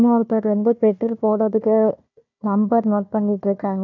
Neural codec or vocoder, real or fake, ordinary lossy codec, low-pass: codec, 16 kHz, 1 kbps, FunCodec, trained on Chinese and English, 50 frames a second; fake; none; 7.2 kHz